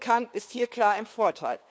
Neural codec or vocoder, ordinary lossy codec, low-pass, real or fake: codec, 16 kHz, 2 kbps, FunCodec, trained on LibriTTS, 25 frames a second; none; none; fake